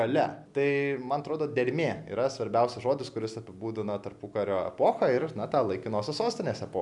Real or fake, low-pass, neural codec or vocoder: real; 10.8 kHz; none